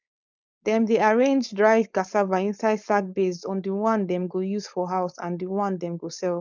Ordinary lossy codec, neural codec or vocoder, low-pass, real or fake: none; codec, 16 kHz, 4.8 kbps, FACodec; 7.2 kHz; fake